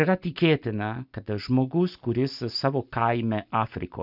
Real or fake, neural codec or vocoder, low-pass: real; none; 5.4 kHz